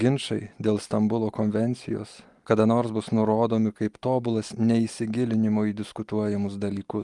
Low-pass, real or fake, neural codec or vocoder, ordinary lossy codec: 10.8 kHz; real; none; Opus, 32 kbps